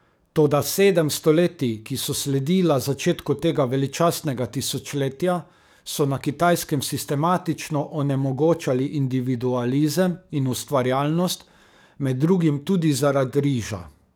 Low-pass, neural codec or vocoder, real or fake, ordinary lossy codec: none; codec, 44.1 kHz, 7.8 kbps, DAC; fake; none